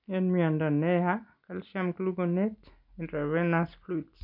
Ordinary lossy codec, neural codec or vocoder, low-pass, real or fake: none; none; 5.4 kHz; real